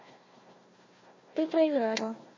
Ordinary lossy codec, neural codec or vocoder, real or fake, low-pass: MP3, 32 kbps; codec, 16 kHz, 1 kbps, FunCodec, trained on Chinese and English, 50 frames a second; fake; 7.2 kHz